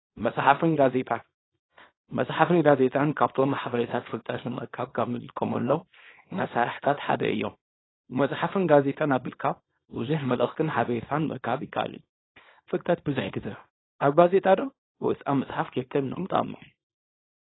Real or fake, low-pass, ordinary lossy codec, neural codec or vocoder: fake; 7.2 kHz; AAC, 16 kbps; codec, 24 kHz, 0.9 kbps, WavTokenizer, small release